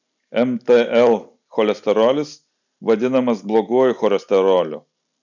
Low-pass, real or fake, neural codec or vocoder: 7.2 kHz; real; none